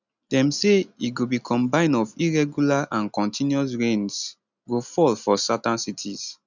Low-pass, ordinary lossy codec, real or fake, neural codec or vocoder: 7.2 kHz; none; real; none